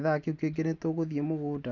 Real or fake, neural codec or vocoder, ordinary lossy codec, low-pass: real; none; none; 7.2 kHz